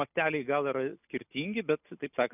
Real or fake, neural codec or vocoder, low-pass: real; none; 3.6 kHz